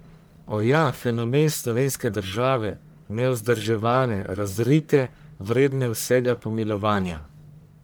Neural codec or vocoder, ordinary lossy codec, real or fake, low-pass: codec, 44.1 kHz, 1.7 kbps, Pupu-Codec; none; fake; none